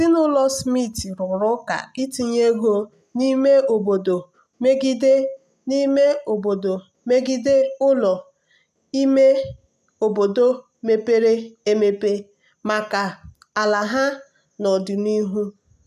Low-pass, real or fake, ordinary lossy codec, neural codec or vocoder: 14.4 kHz; real; none; none